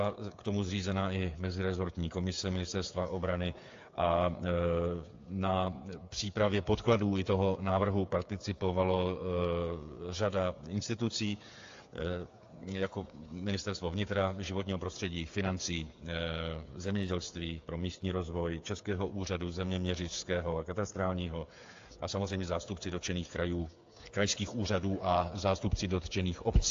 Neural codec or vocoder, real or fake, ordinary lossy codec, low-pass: codec, 16 kHz, 8 kbps, FreqCodec, smaller model; fake; AAC, 48 kbps; 7.2 kHz